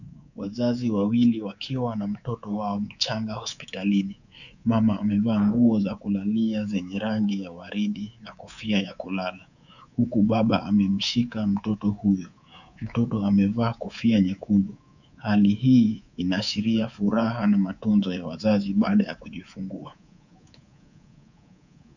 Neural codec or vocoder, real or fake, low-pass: codec, 24 kHz, 3.1 kbps, DualCodec; fake; 7.2 kHz